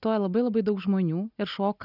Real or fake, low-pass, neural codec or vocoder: real; 5.4 kHz; none